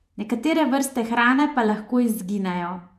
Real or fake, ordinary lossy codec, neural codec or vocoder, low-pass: fake; MP3, 96 kbps; vocoder, 48 kHz, 128 mel bands, Vocos; 14.4 kHz